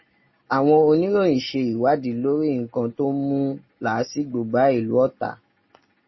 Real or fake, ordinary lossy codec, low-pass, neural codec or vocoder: real; MP3, 24 kbps; 7.2 kHz; none